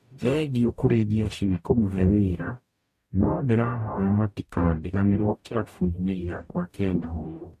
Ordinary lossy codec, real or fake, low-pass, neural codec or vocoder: MP3, 64 kbps; fake; 14.4 kHz; codec, 44.1 kHz, 0.9 kbps, DAC